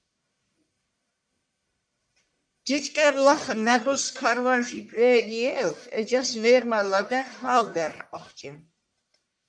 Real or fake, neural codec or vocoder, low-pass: fake; codec, 44.1 kHz, 1.7 kbps, Pupu-Codec; 9.9 kHz